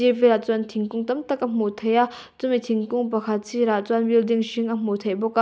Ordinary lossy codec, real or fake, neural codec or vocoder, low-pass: none; real; none; none